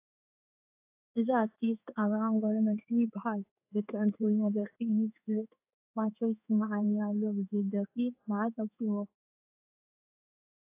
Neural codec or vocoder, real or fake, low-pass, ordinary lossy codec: codec, 16 kHz in and 24 kHz out, 1 kbps, XY-Tokenizer; fake; 3.6 kHz; AAC, 32 kbps